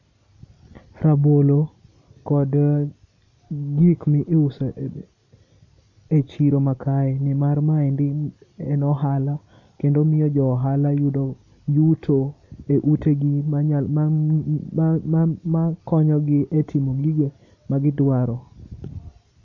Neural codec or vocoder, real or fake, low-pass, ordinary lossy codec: none; real; 7.2 kHz; none